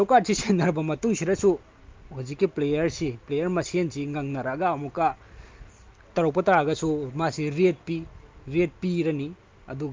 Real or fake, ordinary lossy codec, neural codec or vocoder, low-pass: real; Opus, 32 kbps; none; 7.2 kHz